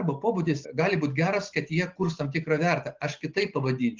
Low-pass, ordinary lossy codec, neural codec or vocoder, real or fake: 7.2 kHz; Opus, 32 kbps; none; real